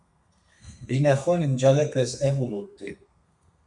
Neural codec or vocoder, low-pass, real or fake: codec, 32 kHz, 1.9 kbps, SNAC; 10.8 kHz; fake